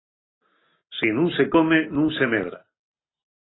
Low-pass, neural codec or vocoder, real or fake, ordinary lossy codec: 7.2 kHz; none; real; AAC, 16 kbps